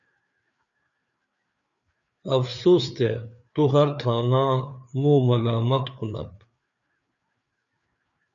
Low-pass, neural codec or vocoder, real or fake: 7.2 kHz; codec, 16 kHz, 4 kbps, FreqCodec, larger model; fake